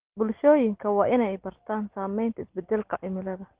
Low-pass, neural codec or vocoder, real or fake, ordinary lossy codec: 3.6 kHz; none; real; Opus, 16 kbps